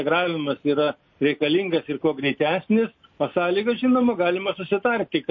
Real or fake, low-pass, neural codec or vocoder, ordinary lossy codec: real; 7.2 kHz; none; MP3, 32 kbps